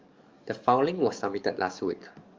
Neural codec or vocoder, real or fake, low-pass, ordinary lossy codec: codec, 44.1 kHz, 7.8 kbps, DAC; fake; 7.2 kHz; Opus, 32 kbps